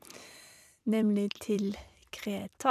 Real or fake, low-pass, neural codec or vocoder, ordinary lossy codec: fake; 14.4 kHz; vocoder, 44.1 kHz, 128 mel bands every 256 samples, BigVGAN v2; none